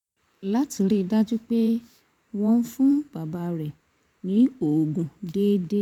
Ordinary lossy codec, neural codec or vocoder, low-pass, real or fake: none; vocoder, 44.1 kHz, 128 mel bands every 512 samples, BigVGAN v2; 19.8 kHz; fake